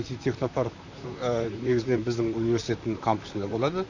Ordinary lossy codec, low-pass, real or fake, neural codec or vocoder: none; 7.2 kHz; fake; vocoder, 44.1 kHz, 128 mel bands, Pupu-Vocoder